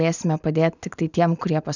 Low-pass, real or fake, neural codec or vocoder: 7.2 kHz; real; none